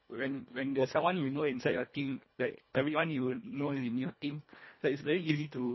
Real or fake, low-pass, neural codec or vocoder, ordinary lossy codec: fake; 7.2 kHz; codec, 24 kHz, 1.5 kbps, HILCodec; MP3, 24 kbps